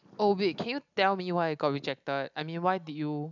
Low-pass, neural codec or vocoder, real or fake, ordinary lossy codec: 7.2 kHz; none; real; none